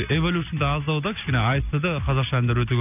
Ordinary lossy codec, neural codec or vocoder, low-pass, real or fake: none; none; 3.6 kHz; real